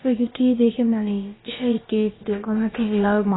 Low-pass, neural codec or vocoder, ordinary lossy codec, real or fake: 7.2 kHz; codec, 16 kHz, about 1 kbps, DyCAST, with the encoder's durations; AAC, 16 kbps; fake